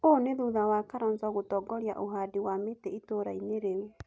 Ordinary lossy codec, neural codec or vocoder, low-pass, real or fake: none; none; none; real